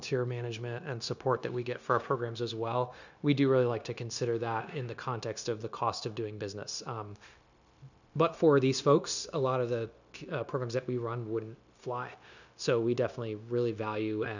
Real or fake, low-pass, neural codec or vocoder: fake; 7.2 kHz; codec, 16 kHz, 0.9 kbps, LongCat-Audio-Codec